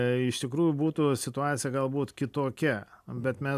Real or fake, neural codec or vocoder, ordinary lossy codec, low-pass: real; none; MP3, 96 kbps; 14.4 kHz